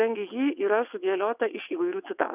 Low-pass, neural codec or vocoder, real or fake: 3.6 kHz; vocoder, 22.05 kHz, 80 mel bands, WaveNeXt; fake